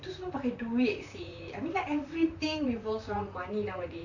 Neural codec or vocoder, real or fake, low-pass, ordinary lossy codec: vocoder, 44.1 kHz, 128 mel bands, Pupu-Vocoder; fake; 7.2 kHz; none